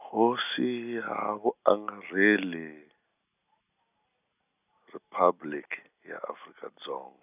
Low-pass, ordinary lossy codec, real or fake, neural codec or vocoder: 3.6 kHz; none; real; none